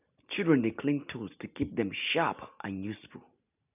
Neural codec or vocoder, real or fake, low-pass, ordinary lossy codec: none; real; 3.6 kHz; none